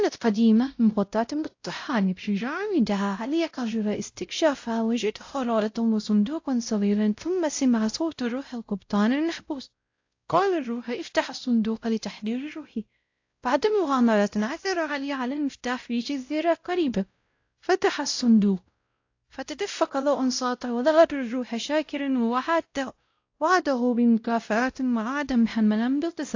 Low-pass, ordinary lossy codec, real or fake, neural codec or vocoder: 7.2 kHz; AAC, 48 kbps; fake; codec, 16 kHz, 0.5 kbps, X-Codec, WavLM features, trained on Multilingual LibriSpeech